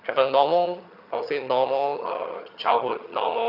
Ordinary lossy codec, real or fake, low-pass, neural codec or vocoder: none; fake; 5.4 kHz; vocoder, 22.05 kHz, 80 mel bands, HiFi-GAN